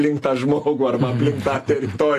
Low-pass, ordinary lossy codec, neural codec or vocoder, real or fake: 14.4 kHz; AAC, 48 kbps; none; real